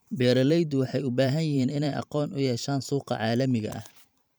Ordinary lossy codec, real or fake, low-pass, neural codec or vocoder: none; fake; none; vocoder, 44.1 kHz, 128 mel bands every 512 samples, BigVGAN v2